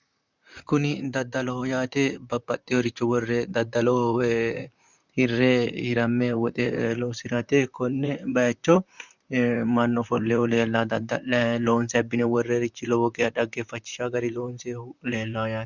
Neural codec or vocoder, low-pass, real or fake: vocoder, 44.1 kHz, 128 mel bands, Pupu-Vocoder; 7.2 kHz; fake